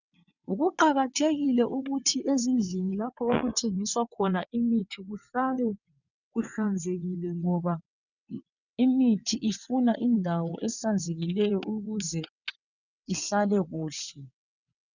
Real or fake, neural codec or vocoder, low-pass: fake; vocoder, 22.05 kHz, 80 mel bands, WaveNeXt; 7.2 kHz